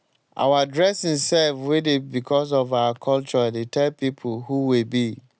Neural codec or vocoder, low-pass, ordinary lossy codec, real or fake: none; none; none; real